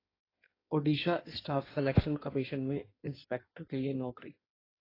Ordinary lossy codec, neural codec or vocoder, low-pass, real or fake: AAC, 24 kbps; codec, 16 kHz in and 24 kHz out, 1.1 kbps, FireRedTTS-2 codec; 5.4 kHz; fake